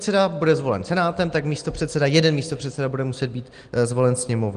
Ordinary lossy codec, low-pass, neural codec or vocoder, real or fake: Opus, 24 kbps; 9.9 kHz; none; real